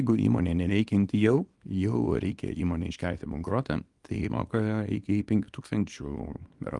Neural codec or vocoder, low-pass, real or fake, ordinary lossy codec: codec, 24 kHz, 0.9 kbps, WavTokenizer, small release; 10.8 kHz; fake; Opus, 32 kbps